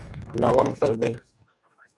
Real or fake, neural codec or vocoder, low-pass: fake; codec, 44.1 kHz, 2.6 kbps, DAC; 10.8 kHz